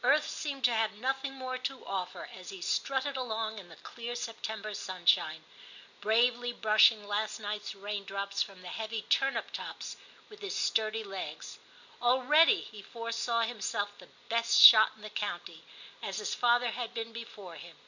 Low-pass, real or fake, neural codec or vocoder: 7.2 kHz; real; none